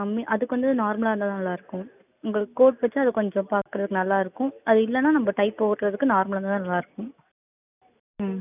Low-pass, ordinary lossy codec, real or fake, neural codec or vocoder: 3.6 kHz; none; real; none